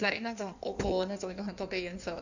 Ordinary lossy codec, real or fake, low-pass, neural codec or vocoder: none; fake; 7.2 kHz; codec, 16 kHz in and 24 kHz out, 1.1 kbps, FireRedTTS-2 codec